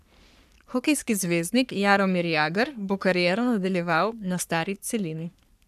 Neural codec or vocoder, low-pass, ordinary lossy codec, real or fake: codec, 44.1 kHz, 3.4 kbps, Pupu-Codec; 14.4 kHz; none; fake